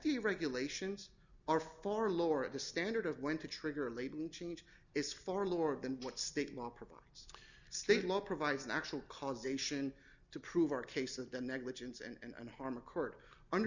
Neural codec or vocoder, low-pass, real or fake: none; 7.2 kHz; real